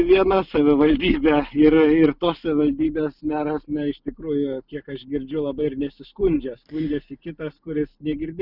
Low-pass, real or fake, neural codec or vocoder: 5.4 kHz; real; none